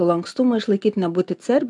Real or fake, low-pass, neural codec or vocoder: real; 10.8 kHz; none